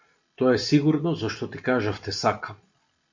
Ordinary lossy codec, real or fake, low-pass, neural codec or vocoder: MP3, 48 kbps; real; 7.2 kHz; none